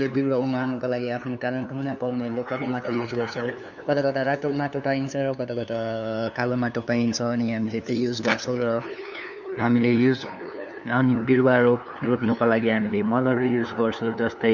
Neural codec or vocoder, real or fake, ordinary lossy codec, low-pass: codec, 16 kHz, 2 kbps, FunCodec, trained on LibriTTS, 25 frames a second; fake; none; 7.2 kHz